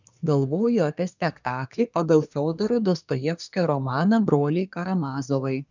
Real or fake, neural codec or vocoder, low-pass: fake; codec, 24 kHz, 1 kbps, SNAC; 7.2 kHz